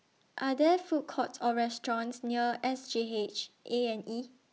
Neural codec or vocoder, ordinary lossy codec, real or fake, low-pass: none; none; real; none